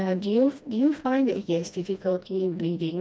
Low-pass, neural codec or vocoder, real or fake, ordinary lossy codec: none; codec, 16 kHz, 1 kbps, FreqCodec, smaller model; fake; none